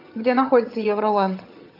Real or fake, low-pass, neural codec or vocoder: fake; 5.4 kHz; vocoder, 22.05 kHz, 80 mel bands, HiFi-GAN